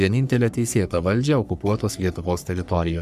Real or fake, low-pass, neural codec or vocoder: fake; 14.4 kHz; codec, 44.1 kHz, 3.4 kbps, Pupu-Codec